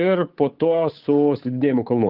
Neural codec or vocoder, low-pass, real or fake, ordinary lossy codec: codec, 16 kHz, 8 kbps, FunCodec, trained on Chinese and English, 25 frames a second; 5.4 kHz; fake; Opus, 24 kbps